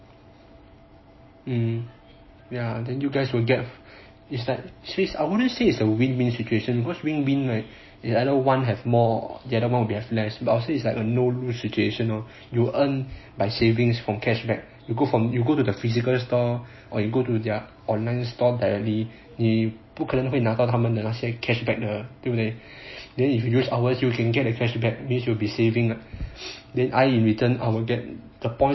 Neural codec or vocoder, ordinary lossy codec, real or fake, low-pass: none; MP3, 24 kbps; real; 7.2 kHz